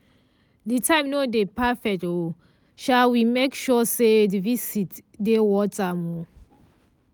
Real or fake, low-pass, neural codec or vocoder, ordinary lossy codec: real; none; none; none